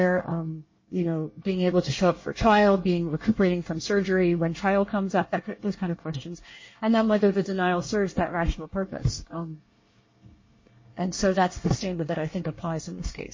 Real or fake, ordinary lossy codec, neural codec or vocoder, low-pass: fake; MP3, 32 kbps; codec, 24 kHz, 1 kbps, SNAC; 7.2 kHz